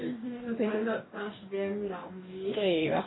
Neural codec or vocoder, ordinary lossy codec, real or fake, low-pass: codec, 44.1 kHz, 2.6 kbps, DAC; AAC, 16 kbps; fake; 7.2 kHz